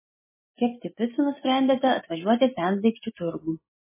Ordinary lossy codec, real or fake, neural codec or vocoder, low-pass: MP3, 16 kbps; real; none; 3.6 kHz